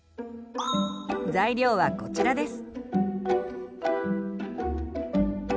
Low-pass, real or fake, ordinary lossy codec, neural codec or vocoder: none; real; none; none